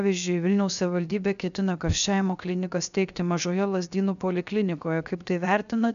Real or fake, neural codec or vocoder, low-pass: fake; codec, 16 kHz, 0.7 kbps, FocalCodec; 7.2 kHz